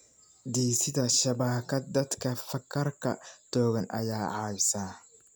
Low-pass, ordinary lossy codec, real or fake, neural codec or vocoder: none; none; real; none